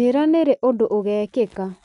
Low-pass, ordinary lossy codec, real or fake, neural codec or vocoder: 10.8 kHz; none; real; none